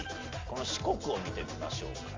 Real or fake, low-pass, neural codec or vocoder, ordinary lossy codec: real; 7.2 kHz; none; Opus, 32 kbps